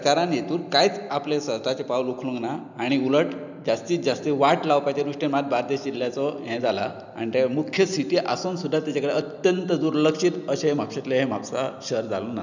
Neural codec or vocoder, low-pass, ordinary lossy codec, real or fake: none; 7.2 kHz; none; real